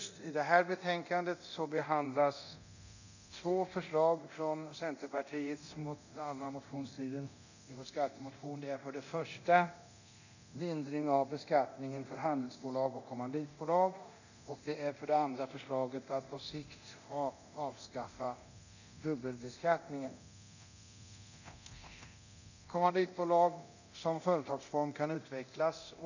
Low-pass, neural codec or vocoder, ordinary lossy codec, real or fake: 7.2 kHz; codec, 24 kHz, 0.9 kbps, DualCodec; none; fake